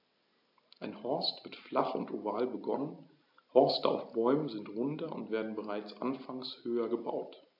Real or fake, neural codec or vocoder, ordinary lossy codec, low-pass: real; none; AAC, 48 kbps; 5.4 kHz